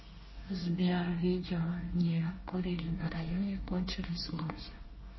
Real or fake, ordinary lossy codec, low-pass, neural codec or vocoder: fake; MP3, 24 kbps; 7.2 kHz; codec, 24 kHz, 1 kbps, SNAC